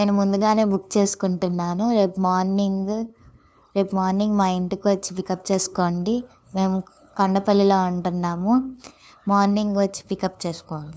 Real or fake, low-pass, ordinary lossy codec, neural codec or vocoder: fake; none; none; codec, 16 kHz, 2 kbps, FunCodec, trained on LibriTTS, 25 frames a second